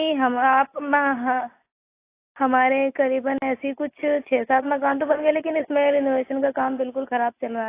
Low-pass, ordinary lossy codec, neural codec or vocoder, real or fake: 3.6 kHz; AAC, 24 kbps; none; real